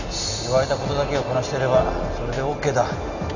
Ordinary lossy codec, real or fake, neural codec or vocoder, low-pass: none; real; none; 7.2 kHz